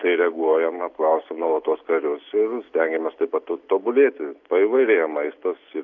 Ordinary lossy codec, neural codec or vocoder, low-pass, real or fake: AAC, 48 kbps; none; 7.2 kHz; real